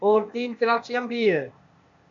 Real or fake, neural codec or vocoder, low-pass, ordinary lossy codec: fake; codec, 16 kHz, 0.8 kbps, ZipCodec; 7.2 kHz; MP3, 64 kbps